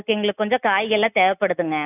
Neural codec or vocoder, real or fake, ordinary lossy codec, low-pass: none; real; none; 3.6 kHz